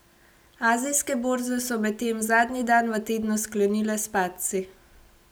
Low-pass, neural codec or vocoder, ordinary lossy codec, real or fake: none; none; none; real